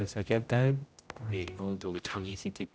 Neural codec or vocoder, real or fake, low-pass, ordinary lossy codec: codec, 16 kHz, 0.5 kbps, X-Codec, HuBERT features, trained on general audio; fake; none; none